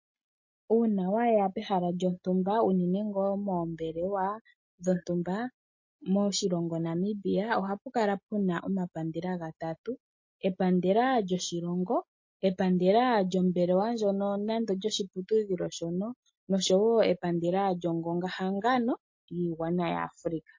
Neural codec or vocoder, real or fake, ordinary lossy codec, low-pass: none; real; MP3, 32 kbps; 7.2 kHz